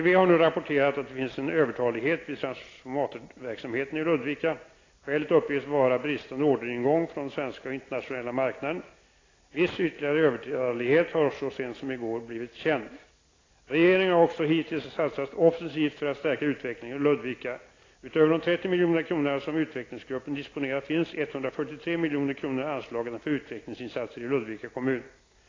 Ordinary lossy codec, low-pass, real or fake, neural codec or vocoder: AAC, 32 kbps; 7.2 kHz; real; none